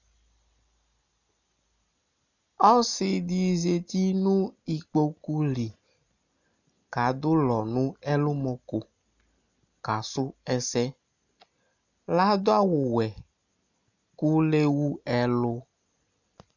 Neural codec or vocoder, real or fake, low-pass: none; real; 7.2 kHz